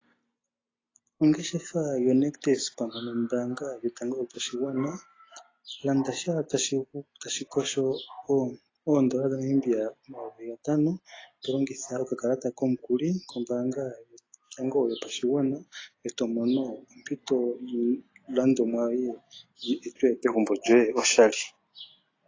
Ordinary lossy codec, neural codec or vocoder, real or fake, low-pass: AAC, 32 kbps; none; real; 7.2 kHz